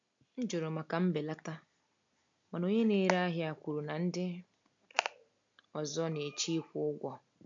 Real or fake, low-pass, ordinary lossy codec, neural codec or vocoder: real; 7.2 kHz; MP3, 64 kbps; none